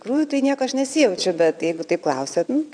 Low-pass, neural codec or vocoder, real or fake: 9.9 kHz; vocoder, 22.05 kHz, 80 mel bands, WaveNeXt; fake